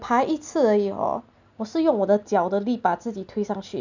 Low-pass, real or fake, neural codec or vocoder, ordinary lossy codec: 7.2 kHz; real; none; none